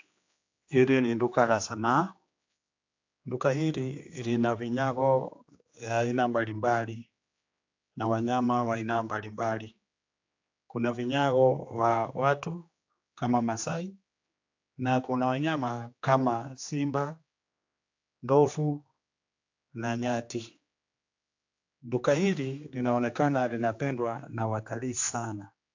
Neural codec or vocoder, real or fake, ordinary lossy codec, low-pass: codec, 16 kHz, 2 kbps, X-Codec, HuBERT features, trained on general audio; fake; AAC, 48 kbps; 7.2 kHz